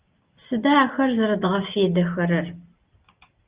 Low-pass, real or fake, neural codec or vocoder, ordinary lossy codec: 3.6 kHz; real; none; Opus, 24 kbps